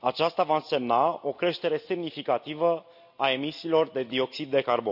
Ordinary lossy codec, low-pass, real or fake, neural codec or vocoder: none; 5.4 kHz; real; none